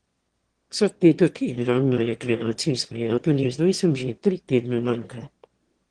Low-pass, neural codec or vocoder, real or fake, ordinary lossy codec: 9.9 kHz; autoencoder, 22.05 kHz, a latent of 192 numbers a frame, VITS, trained on one speaker; fake; Opus, 16 kbps